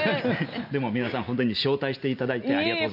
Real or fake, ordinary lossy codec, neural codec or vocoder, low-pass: real; none; none; 5.4 kHz